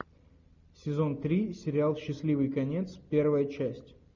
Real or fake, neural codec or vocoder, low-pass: real; none; 7.2 kHz